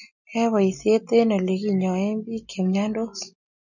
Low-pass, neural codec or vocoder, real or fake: 7.2 kHz; none; real